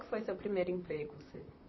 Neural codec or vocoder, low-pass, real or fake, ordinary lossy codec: vocoder, 44.1 kHz, 128 mel bands, Pupu-Vocoder; 7.2 kHz; fake; MP3, 24 kbps